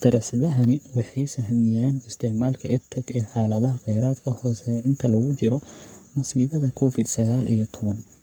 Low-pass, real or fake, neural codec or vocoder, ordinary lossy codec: none; fake; codec, 44.1 kHz, 3.4 kbps, Pupu-Codec; none